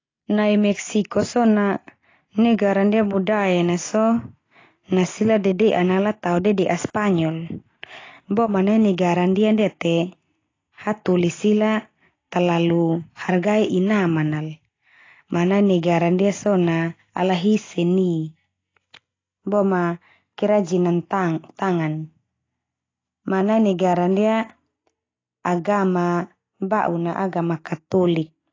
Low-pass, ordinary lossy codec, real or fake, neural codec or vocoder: 7.2 kHz; AAC, 32 kbps; real; none